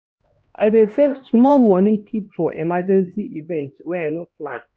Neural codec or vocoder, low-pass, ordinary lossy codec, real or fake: codec, 16 kHz, 1 kbps, X-Codec, HuBERT features, trained on LibriSpeech; none; none; fake